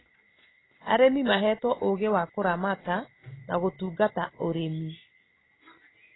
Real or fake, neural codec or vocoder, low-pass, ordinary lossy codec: real; none; 7.2 kHz; AAC, 16 kbps